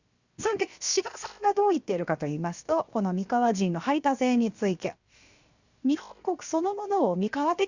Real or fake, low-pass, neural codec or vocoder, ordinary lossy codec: fake; 7.2 kHz; codec, 16 kHz, 0.7 kbps, FocalCodec; Opus, 64 kbps